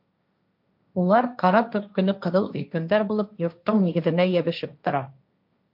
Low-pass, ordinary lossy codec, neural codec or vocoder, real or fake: 5.4 kHz; MP3, 48 kbps; codec, 16 kHz, 1.1 kbps, Voila-Tokenizer; fake